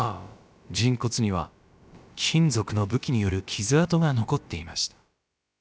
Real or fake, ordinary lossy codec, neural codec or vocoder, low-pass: fake; none; codec, 16 kHz, about 1 kbps, DyCAST, with the encoder's durations; none